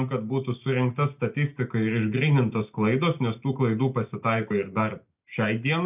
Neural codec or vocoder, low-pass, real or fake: none; 3.6 kHz; real